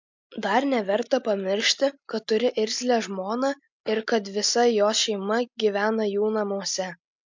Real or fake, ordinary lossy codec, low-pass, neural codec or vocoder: real; MP3, 64 kbps; 7.2 kHz; none